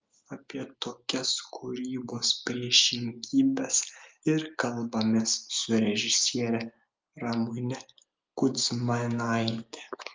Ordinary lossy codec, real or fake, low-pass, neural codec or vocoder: Opus, 32 kbps; real; 7.2 kHz; none